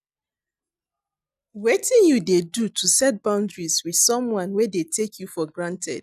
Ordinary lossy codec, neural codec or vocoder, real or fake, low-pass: none; none; real; 14.4 kHz